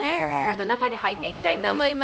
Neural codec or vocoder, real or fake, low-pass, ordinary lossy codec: codec, 16 kHz, 1 kbps, X-Codec, HuBERT features, trained on LibriSpeech; fake; none; none